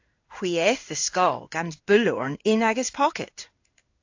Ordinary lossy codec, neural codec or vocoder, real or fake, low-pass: AAC, 48 kbps; codec, 16 kHz in and 24 kHz out, 1 kbps, XY-Tokenizer; fake; 7.2 kHz